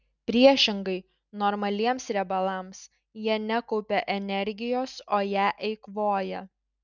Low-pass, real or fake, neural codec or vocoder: 7.2 kHz; real; none